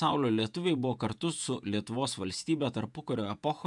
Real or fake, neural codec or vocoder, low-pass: fake; vocoder, 48 kHz, 128 mel bands, Vocos; 10.8 kHz